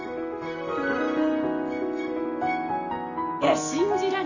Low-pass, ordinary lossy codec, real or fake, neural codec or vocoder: 7.2 kHz; none; real; none